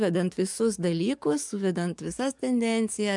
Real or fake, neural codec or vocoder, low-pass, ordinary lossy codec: fake; codec, 44.1 kHz, 7.8 kbps, DAC; 10.8 kHz; MP3, 96 kbps